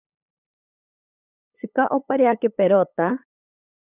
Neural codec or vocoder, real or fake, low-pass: codec, 16 kHz, 8 kbps, FunCodec, trained on LibriTTS, 25 frames a second; fake; 3.6 kHz